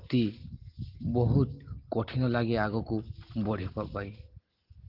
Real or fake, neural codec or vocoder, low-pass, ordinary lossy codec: real; none; 5.4 kHz; Opus, 16 kbps